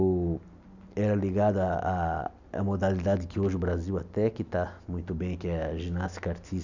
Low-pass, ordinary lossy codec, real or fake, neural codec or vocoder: 7.2 kHz; none; real; none